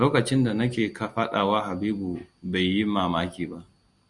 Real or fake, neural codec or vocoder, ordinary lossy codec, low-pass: real; none; Opus, 64 kbps; 10.8 kHz